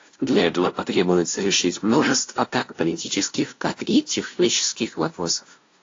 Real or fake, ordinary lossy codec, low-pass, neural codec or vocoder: fake; AAC, 32 kbps; 7.2 kHz; codec, 16 kHz, 0.5 kbps, FunCodec, trained on LibriTTS, 25 frames a second